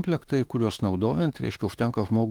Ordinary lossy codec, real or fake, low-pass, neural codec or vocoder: Opus, 24 kbps; fake; 19.8 kHz; autoencoder, 48 kHz, 32 numbers a frame, DAC-VAE, trained on Japanese speech